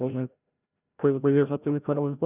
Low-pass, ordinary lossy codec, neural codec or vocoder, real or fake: 3.6 kHz; none; codec, 16 kHz, 0.5 kbps, FreqCodec, larger model; fake